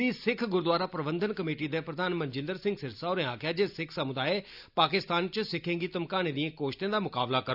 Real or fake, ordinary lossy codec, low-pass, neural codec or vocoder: real; none; 5.4 kHz; none